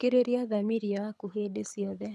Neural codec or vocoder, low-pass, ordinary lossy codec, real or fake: codec, 24 kHz, 6 kbps, HILCodec; none; none; fake